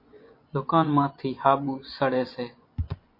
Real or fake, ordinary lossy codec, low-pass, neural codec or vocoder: fake; MP3, 32 kbps; 5.4 kHz; vocoder, 24 kHz, 100 mel bands, Vocos